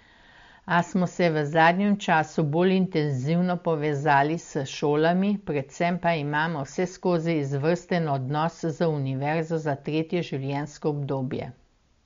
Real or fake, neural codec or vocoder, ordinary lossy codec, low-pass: real; none; MP3, 48 kbps; 7.2 kHz